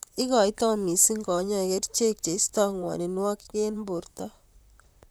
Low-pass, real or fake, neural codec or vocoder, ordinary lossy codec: none; fake; vocoder, 44.1 kHz, 128 mel bands, Pupu-Vocoder; none